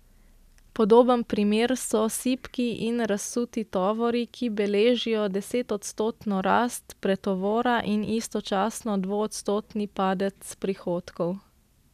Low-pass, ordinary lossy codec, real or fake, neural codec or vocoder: 14.4 kHz; none; real; none